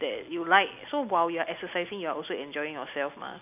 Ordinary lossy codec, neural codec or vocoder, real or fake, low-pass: none; none; real; 3.6 kHz